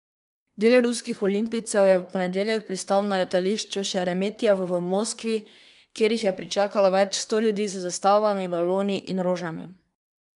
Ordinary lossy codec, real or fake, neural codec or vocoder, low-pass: none; fake; codec, 24 kHz, 1 kbps, SNAC; 10.8 kHz